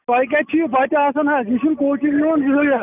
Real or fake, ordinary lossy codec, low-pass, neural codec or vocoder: real; none; 3.6 kHz; none